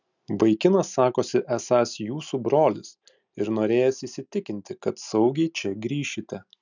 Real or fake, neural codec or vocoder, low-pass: real; none; 7.2 kHz